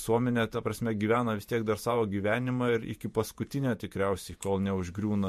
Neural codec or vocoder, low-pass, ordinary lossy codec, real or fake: vocoder, 48 kHz, 128 mel bands, Vocos; 14.4 kHz; MP3, 64 kbps; fake